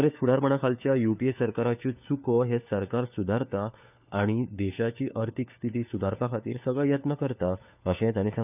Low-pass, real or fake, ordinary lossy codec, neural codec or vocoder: 3.6 kHz; fake; none; codec, 16 kHz, 4 kbps, FunCodec, trained on LibriTTS, 50 frames a second